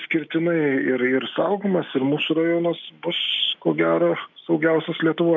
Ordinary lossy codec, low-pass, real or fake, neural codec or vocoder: MP3, 64 kbps; 7.2 kHz; real; none